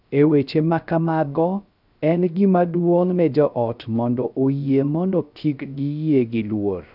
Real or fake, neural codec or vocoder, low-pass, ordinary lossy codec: fake; codec, 16 kHz, 0.3 kbps, FocalCodec; 5.4 kHz; none